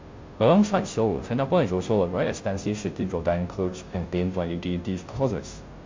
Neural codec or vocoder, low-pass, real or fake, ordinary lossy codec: codec, 16 kHz, 0.5 kbps, FunCodec, trained on Chinese and English, 25 frames a second; 7.2 kHz; fake; MP3, 48 kbps